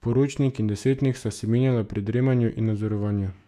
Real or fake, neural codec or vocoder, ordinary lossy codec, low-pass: fake; autoencoder, 48 kHz, 128 numbers a frame, DAC-VAE, trained on Japanese speech; none; 14.4 kHz